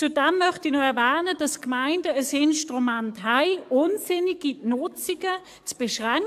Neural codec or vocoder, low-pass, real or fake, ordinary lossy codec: codec, 44.1 kHz, 7.8 kbps, DAC; 14.4 kHz; fake; AAC, 96 kbps